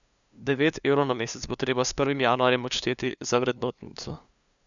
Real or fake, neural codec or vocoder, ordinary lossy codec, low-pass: fake; codec, 16 kHz, 2 kbps, FunCodec, trained on LibriTTS, 25 frames a second; none; 7.2 kHz